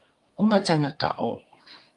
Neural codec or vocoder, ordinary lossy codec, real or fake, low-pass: codec, 24 kHz, 1 kbps, SNAC; Opus, 32 kbps; fake; 10.8 kHz